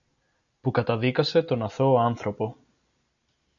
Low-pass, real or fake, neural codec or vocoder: 7.2 kHz; real; none